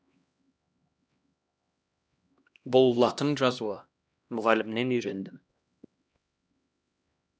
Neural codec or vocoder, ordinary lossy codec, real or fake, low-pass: codec, 16 kHz, 1 kbps, X-Codec, HuBERT features, trained on LibriSpeech; none; fake; none